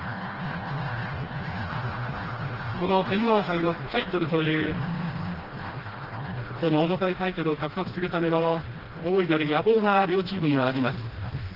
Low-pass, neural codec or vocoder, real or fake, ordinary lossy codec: 5.4 kHz; codec, 16 kHz, 1 kbps, FreqCodec, smaller model; fake; Opus, 16 kbps